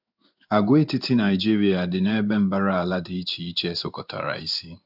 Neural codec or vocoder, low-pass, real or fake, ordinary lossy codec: codec, 16 kHz in and 24 kHz out, 1 kbps, XY-Tokenizer; 5.4 kHz; fake; none